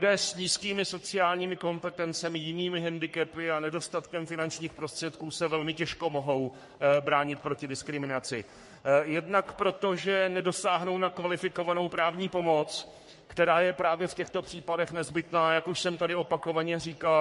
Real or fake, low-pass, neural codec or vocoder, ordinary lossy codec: fake; 14.4 kHz; codec, 44.1 kHz, 3.4 kbps, Pupu-Codec; MP3, 48 kbps